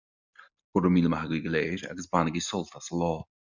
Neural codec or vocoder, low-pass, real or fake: none; 7.2 kHz; real